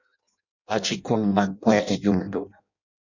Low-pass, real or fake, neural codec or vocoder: 7.2 kHz; fake; codec, 16 kHz in and 24 kHz out, 0.6 kbps, FireRedTTS-2 codec